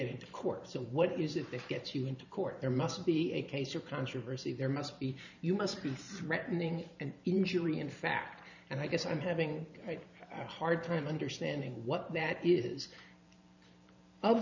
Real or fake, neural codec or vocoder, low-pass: fake; vocoder, 44.1 kHz, 128 mel bands every 512 samples, BigVGAN v2; 7.2 kHz